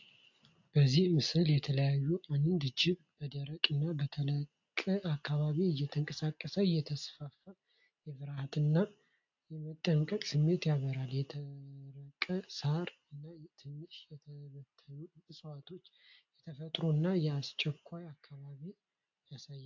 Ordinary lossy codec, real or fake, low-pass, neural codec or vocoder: AAC, 48 kbps; real; 7.2 kHz; none